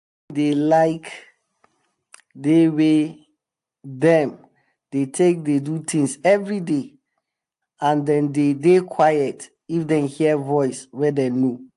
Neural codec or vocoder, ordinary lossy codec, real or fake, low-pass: none; AAC, 64 kbps; real; 10.8 kHz